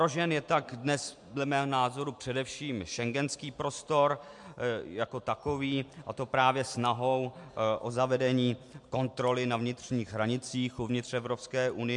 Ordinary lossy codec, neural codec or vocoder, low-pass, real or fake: MP3, 64 kbps; none; 10.8 kHz; real